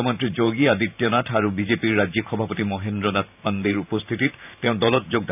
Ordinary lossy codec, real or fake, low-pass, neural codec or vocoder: none; fake; 3.6 kHz; vocoder, 44.1 kHz, 128 mel bands every 512 samples, BigVGAN v2